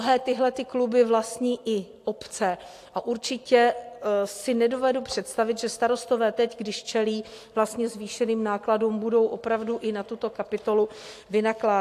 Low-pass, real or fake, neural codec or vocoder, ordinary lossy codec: 14.4 kHz; real; none; AAC, 64 kbps